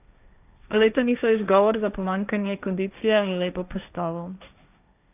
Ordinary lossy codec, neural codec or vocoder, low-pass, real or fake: none; codec, 16 kHz, 1.1 kbps, Voila-Tokenizer; 3.6 kHz; fake